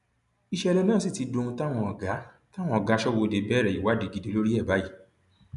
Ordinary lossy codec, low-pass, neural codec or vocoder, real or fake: none; 10.8 kHz; none; real